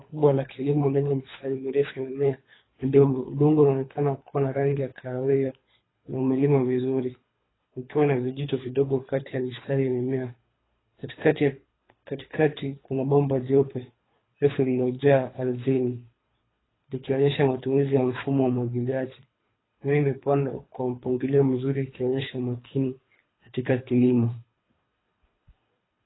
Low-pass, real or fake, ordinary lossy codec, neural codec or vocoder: 7.2 kHz; fake; AAC, 16 kbps; codec, 24 kHz, 3 kbps, HILCodec